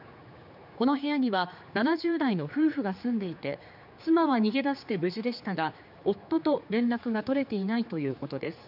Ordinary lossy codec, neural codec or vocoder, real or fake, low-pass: none; codec, 16 kHz, 4 kbps, X-Codec, HuBERT features, trained on general audio; fake; 5.4 kHz